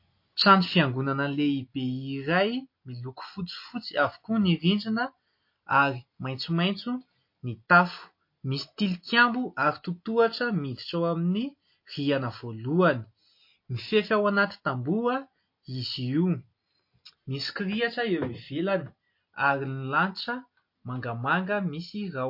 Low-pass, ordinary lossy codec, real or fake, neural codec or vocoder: 5.4 kHz; MP3, 32 kbps; real; none